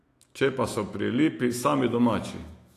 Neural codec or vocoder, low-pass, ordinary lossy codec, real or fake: autoencoder, 48 kHz, 128 numbers a frame, DAC-VAE, trained on Japanese speech; 14.4 kHz; AAC, 48 kbps; fake